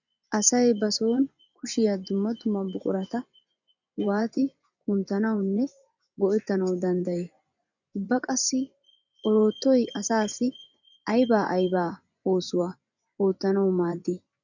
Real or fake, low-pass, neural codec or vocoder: fake; 7.2 kHz; vocoder, 24 kHz, 100 mel bands, Vocos